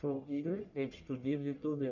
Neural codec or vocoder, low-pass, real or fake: codec, 44.1 kHz, 1.7 kbps, Pupu-Codec; 7.2 kHz; fake